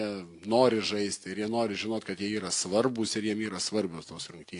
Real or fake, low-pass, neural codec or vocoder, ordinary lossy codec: real; 10.8 kHz; none; AAC, 48 kbps